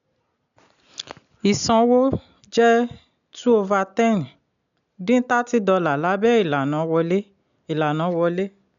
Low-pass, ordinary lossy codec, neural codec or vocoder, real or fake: 7.2 kHz; none; none; real